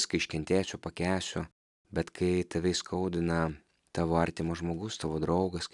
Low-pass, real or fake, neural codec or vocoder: 10.8 kHz; real; none